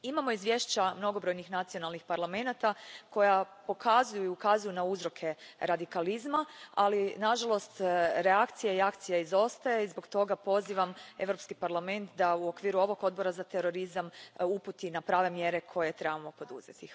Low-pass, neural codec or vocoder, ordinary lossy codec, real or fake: none; none; none; real